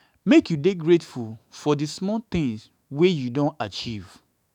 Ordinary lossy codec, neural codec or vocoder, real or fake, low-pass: none; autoencoder, 48 kHz, 128 numbers a frame, DAC-VAE, trained on Japanese speech; fake; 19.8 kHz